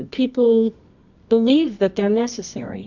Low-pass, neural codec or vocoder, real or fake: 7.2 kHz; codec, 24 kHz, 0.9 kbps, WavTokenizer, medium music audio release; fake